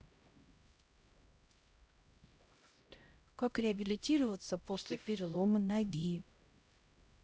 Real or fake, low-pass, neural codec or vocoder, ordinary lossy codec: fake; none; codec, 16 kHz, 0.5 kbps, X-Codec, HuBERT features, trained on LibriSpeech; none